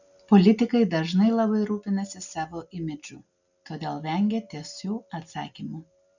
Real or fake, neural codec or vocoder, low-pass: real; none; 7.2 kHz